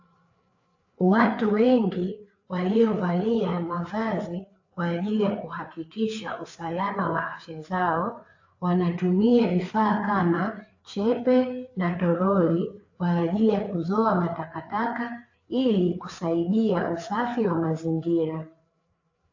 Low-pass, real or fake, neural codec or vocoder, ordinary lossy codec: 7.2 kHz; fake; codec, 16 kHz, 4 kbps, FreqCodec, larger model; AAC, 48 kbps